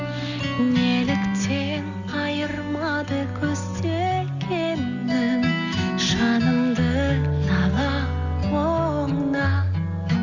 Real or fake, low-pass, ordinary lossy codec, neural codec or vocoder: real; 7.2 kHz; none; none